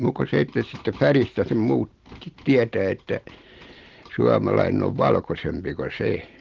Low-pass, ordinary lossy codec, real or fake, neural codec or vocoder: 7.2 kHz; Opus, 16 kbps; real; none